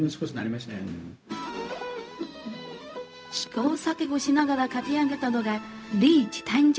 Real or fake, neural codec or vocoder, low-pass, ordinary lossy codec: fake; codec, 16 kHz, 0.4 kbps, LongCat-Audio-Codec; none; none